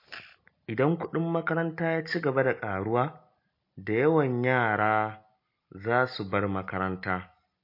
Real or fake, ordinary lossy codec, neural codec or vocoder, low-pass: real; MP3, 32 kbps; none; 5.4 kHz